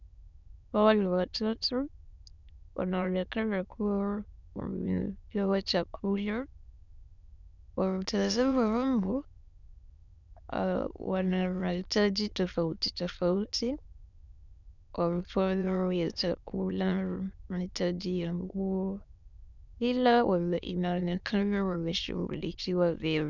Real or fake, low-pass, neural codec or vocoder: fake; 7.2 kHz; autoencoder, 22.05 kHz, a latent of 192 numbers a frame, VITS, trained on many speakers